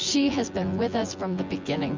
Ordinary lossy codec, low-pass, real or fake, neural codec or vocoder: MP3, 64 kbps; 7.2 kHz; fake; vocoder, 24 kHz, 100 mel bands, Vocos